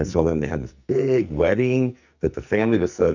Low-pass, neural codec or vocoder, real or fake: 7.2 kHz; codec, 44.1 kHz, 2.6 kbps, SNAC; fake